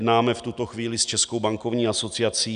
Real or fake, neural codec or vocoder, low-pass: real; none; 9.9 kHz